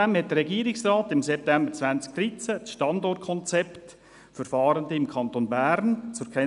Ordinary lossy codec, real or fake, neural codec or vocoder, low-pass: none; real; none; 10.8 kHz